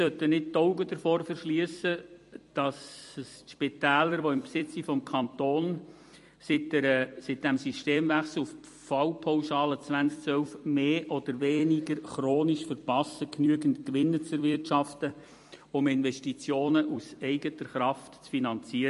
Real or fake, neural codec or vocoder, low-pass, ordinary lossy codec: fake; vocoder, 44.1 kHz, 128 mel bands every 256 samples, BigVGAN v2; 14.4 kHz; MP3, 48 kbps